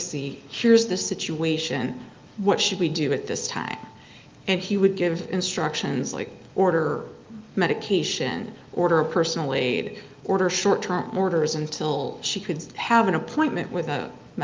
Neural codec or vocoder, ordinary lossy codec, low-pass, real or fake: none; Opus, 32 kbps; 7.2 kHz; real